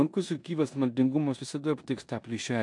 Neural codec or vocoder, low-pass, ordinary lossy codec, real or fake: codec, 16 kHz in and 24 kHz out, 0.9 kbps, LongCat-Audio-Codec, four codebook decoder; 10.8 kHz; MP3, 64 kbps; fake